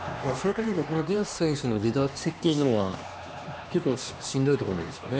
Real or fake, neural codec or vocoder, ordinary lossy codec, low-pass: fake; codec, 16 kHz, 2 kbps, X-Codec, HuBERT features, trained on LibriSpeech; none; none